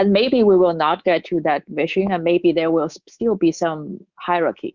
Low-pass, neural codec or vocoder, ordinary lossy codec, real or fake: 7.2 kHz; codec, 24 kHz, 3.1 kbps, DualCodec; Opus, 64 kbps; fake